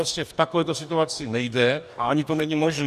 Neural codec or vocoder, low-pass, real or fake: codec, 44.1 kHz, 2.6 kbps, DAC; 14.4 kHz; fake